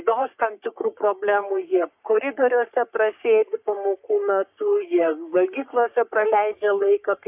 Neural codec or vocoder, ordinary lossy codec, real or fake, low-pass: codec, 44.1 kHz, 3.4 kbps, Pupu-Codec; AAC, 32 kbps; fake; 3.6 kHz